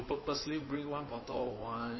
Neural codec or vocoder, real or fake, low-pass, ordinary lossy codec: codec, 16 kHz in and 24 kHz out, 2.2 kbps, FireRedTTS-2 codec; fake; 7.2 kHz; MP3, 24 kbps